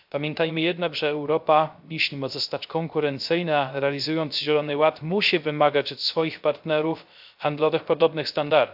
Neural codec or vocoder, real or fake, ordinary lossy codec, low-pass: codec, 16 kHz, 0.3 kbps, FocalCodec; fake; AAC, 48 kbps; 5.4 kHz